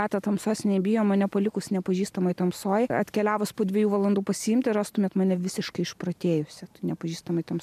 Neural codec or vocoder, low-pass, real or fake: none; 14.4 kHz; real